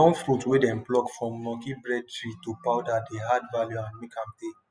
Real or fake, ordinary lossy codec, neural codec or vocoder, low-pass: real; none; none; none